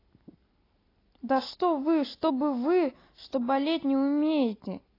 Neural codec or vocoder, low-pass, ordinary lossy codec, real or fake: none; 5.4 kHz; AAC, 24 kbps; real